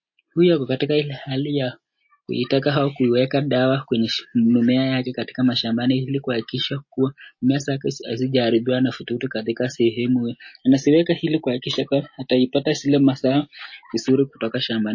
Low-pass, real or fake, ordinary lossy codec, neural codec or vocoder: 7.2 kHz; real; MP3, 32 kbps; none